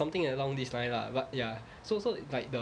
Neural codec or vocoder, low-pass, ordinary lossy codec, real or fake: none; 9.9 kHz; none; real